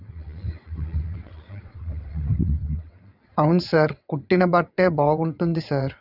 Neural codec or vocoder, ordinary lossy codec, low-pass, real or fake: vocoder, 22.05 kHz, 80 mel bands, WaveNeXt; none; 5.4 kHz; fake